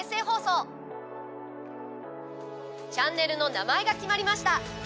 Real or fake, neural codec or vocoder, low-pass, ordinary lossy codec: real; none; none; none